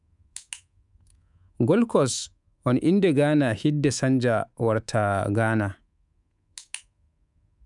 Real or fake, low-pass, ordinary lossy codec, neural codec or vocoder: fake; 10.8 kHz; none; codec, 24 kHz, 3.1 kbps, DualCodec